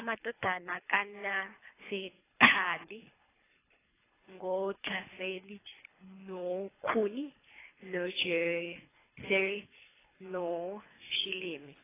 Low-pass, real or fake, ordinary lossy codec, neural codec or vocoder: 3.6 kHz; fake; AAC, 16 kbps; codec, 24 kHz, 3 kbps, HILCodec